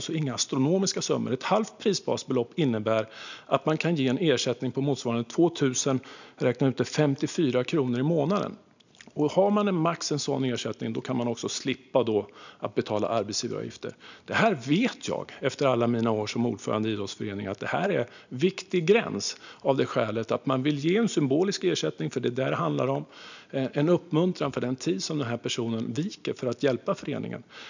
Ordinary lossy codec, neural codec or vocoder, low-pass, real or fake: none; none; 7.2 kHz; real